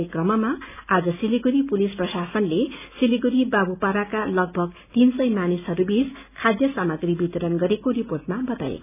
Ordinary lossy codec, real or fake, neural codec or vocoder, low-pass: none; real; none; 3.6 kHz